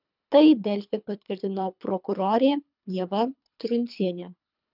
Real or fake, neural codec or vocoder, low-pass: fake; codec, 24 kHz, 3 kbps, HILCodec; 5.4 kHz